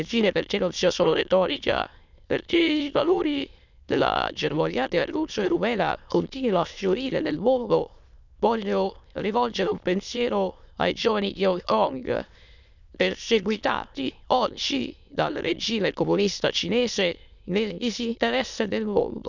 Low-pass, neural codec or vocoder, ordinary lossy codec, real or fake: 7.2 kHz; autoencoder, 22.05 kHz, a latent of 192 numbers a frame, VITS, trained on many speakers; none; fake